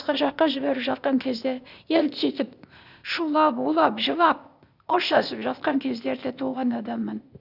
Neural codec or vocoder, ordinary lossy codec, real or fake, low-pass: codec, 16 kHz, 0.8 kbps, ZipCodec; none; fake; 5.4 kHz